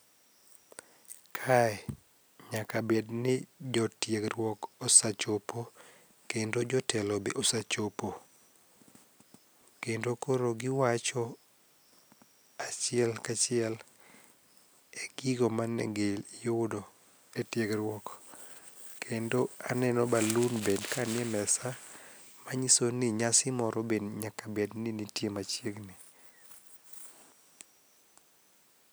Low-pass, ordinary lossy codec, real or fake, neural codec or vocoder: none; none; real; none